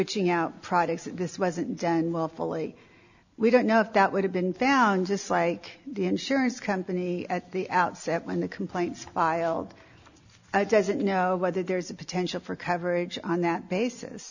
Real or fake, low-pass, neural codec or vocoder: real; 7.2 kHz; none